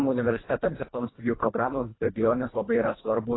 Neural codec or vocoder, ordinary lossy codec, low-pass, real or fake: codec, 24 kHz, 1.5 kbps, HILCodec; AAC, 16 kbps; 7.2 kHz; fake